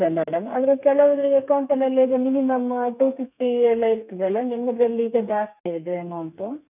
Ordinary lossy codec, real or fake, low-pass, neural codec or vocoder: none; fake; 3.6 kHz; codec, 32 kHz, 1.9 kbps, SNAC